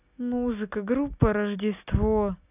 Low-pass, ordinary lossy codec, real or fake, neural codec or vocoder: 3.6 kHz; none; real; none